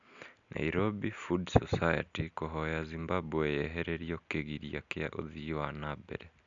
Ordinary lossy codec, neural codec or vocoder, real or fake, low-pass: none; none; real; 7.2 kHz